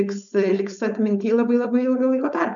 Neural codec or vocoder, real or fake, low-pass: codec, 16 kHz, 4.8 kbps, FACodec; fake; 7.2 kHz